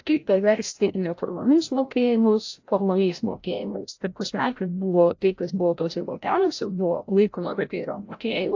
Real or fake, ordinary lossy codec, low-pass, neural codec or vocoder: fake; AAC, 48 kbps; 7.2 kHz; codec, 16 kHz, 0.5 kbps, FreqCodec, larger model